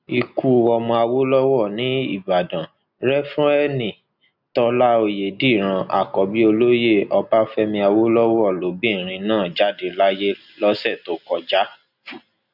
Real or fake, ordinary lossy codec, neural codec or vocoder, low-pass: real; none; none; 5.4 kHz